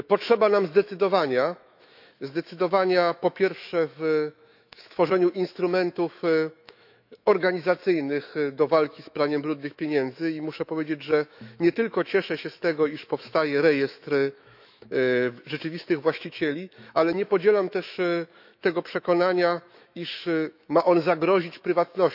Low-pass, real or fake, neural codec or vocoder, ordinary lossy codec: 5.4 kHz; fake; autoencoder, 48 kHz, 128 numbers a frame, DAC-VAE, trained on Japanese speech; none